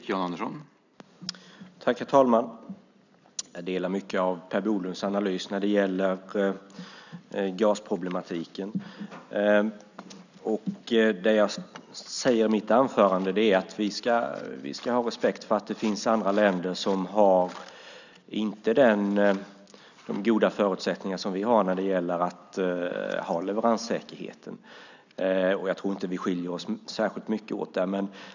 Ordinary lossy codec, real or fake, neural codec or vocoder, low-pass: none; real; none; 7.2 kHz